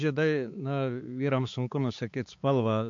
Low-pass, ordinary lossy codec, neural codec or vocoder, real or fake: 7.2 kHz; MP3, 48 kbps; codec, 16 kHz, 4 kbps, X-Codec, HuBERT features, trained on balanced general audio; fake